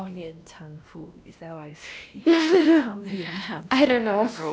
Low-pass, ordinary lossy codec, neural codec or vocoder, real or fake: none; none; codec, 16 kHz, 1 kbps, X-Codec, WavLM features, trained on Multilingual LibriSpeech; fake